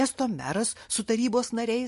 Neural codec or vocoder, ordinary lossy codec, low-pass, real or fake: none; MP3, 48 kbps; 14.4 kHz; real